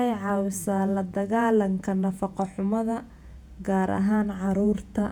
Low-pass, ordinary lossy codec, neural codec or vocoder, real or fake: 19.8 kHz; none; vocoder, 48 kHz, 128 mel bands, Vocos; fake